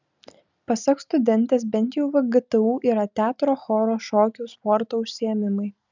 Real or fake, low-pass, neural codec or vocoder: real; 7.2 kHz; none